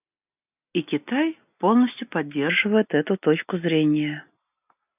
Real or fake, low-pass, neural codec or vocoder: real; 3.6 kHz; none